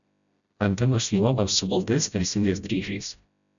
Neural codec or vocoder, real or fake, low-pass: codec, 16 kHz, 0.5 kbps, FreqCodec, smaller model; fake; 7.2 kHz